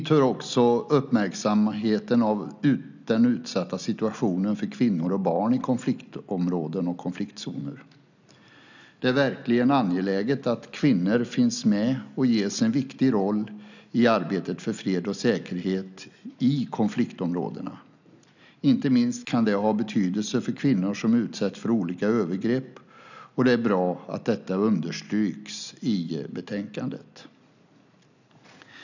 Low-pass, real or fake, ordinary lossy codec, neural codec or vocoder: 7.2 kHz; real; none; none